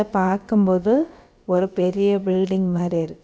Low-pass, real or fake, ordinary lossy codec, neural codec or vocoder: none; fake; none; codec, 16 kHz, about 1 kbps, DyCAST, with the encoder's durations